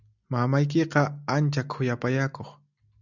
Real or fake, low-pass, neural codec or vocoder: real; 7.2 kHz; none